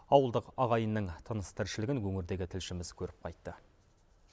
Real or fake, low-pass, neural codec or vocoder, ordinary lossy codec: real; none; none; none